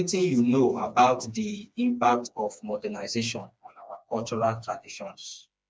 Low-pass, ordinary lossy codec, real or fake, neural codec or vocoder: none; none; fake; codec, 16 kHz, 2 kbps, FreqCodec, smaller model